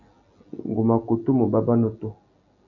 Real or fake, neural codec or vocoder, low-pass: real; none; 7.2 kHz